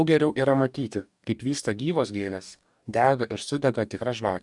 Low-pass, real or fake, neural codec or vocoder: 10.8 kHz; fake; codec, 44.1 kHz, 2.6 kbps, DAC